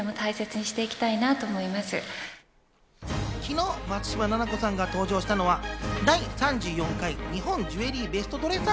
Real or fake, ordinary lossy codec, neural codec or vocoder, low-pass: real; none; none; none